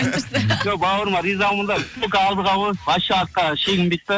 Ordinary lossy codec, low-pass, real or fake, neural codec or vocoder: none; none; real; none